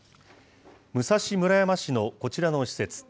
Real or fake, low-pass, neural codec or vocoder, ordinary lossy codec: real; none; none; none